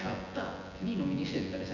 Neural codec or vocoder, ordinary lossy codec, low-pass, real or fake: vocoder, 24 kHz, 100 mel bands, Vocos; none; 7.2 kHz; fake